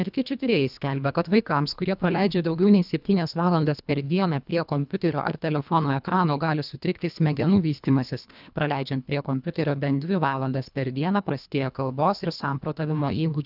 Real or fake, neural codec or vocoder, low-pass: fake; codec, 24 kHz, 1.5 kbps, HILCodec; 5.4 kHz